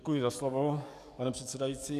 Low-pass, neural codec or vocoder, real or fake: 14.4 kHz; codec, 44.1 kHz, 7.8 kbps, DAC; fake